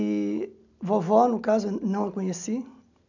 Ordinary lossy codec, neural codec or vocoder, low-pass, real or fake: none; none; 7.2 kHz; real